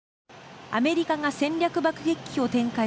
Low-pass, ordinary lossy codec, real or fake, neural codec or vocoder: none; none; real; none